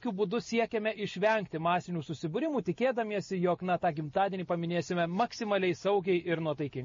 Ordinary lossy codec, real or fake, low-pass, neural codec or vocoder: MP3, 32 kbps; real; 7.2 kHz; none